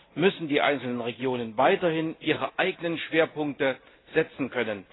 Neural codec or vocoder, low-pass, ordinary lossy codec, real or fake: none; 7.2 kHz; AAC, 16 kbps; real